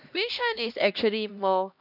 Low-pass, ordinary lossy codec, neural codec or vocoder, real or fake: 5.4 kHz; none; codec, 16 kHz, 1 kbps, X-Codec, HuBERT features, trained on LibriSpeech; fake